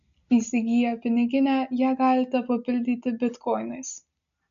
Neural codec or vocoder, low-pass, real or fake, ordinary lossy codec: none; 7.2 kHz; real; MP3, 64 kbps